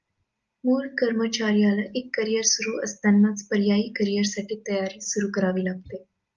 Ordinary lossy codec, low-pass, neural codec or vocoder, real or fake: Opus, 32 kbps; 7.2 kHz; none; real